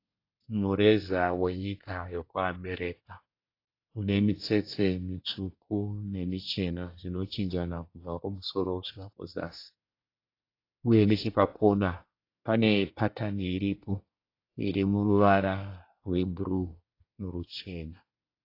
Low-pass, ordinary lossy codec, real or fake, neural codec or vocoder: 5.4 kHz; AAC, 32 kbps; fake; codec, 24 kHz, 1 kbps, SNAC